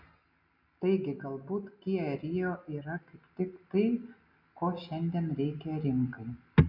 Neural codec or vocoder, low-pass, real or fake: none; 5.4 kHz; real